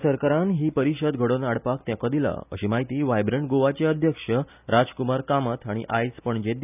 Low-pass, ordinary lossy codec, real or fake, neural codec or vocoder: 3.6 kHz; none; real; none